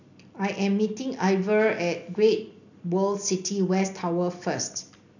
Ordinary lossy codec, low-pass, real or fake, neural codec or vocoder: none; 7.2 kHz; real; none